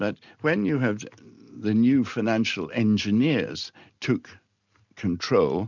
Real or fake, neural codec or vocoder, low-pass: real; none; 7.2 kHz